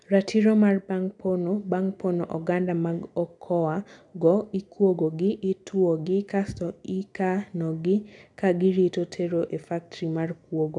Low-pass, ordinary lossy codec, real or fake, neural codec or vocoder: 10.8 kHz; none; real; none